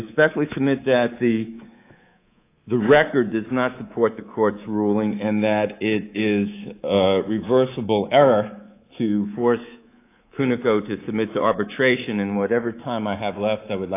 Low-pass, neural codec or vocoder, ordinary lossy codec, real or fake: 3.6 kHz; codec, 24 kHz, 3.1 kbps, DualCodec; AAC, 24 kbps; fake